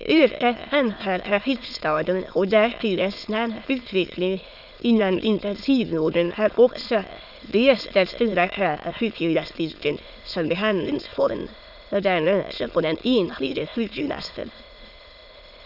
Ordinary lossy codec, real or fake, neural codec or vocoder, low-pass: none; fake; autoencoder, 22.05 kHz, a latent of 192 numbers a frame, VITS, trained on many speakers; 5.4 kHz